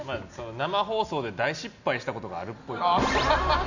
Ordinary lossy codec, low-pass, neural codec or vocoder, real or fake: none; 7.2 kHz; none; real